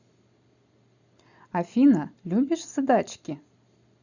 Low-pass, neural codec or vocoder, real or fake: 7.2 kHz; none; real